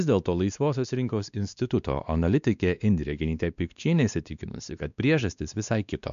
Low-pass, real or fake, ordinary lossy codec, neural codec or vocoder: 7.2 kHz; fake; MP3, 96 kbps; codec, 16 kHz, 2 kbps, X-Codec, WavLM features, trained on Multilingual LibriSpeech